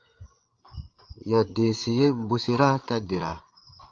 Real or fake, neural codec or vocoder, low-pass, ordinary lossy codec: fake; codec, 16 kHz, 8 kbps, FreqCodec, larger model; 7.2 kHz; Opus, 32 kbps